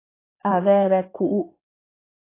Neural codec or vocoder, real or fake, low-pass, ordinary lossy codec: codec, 16 kHz in and 24 kHz out, 2.2 kbps, FireRedTTS-2 codec; fake; 3.6 kHz; AAC, 24 kbps